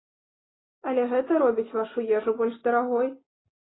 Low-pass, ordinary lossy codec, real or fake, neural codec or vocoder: 7.2 kHz; AAC, 16 kbps; real; none